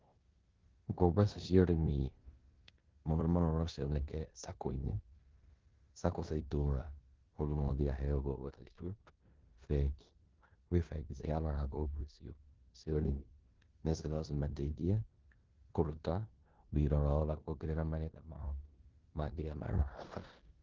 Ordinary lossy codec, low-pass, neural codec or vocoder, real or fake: Opus, 16 kbps; 7.2 kHz; codec, 16 kHz in and 24 kHz out, 0.9 kbps, LongCat-Audio-Codec, four codebook decoder; fake